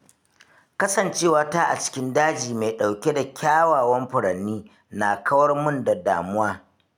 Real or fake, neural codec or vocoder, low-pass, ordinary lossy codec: real; none; 19.8 kHz; none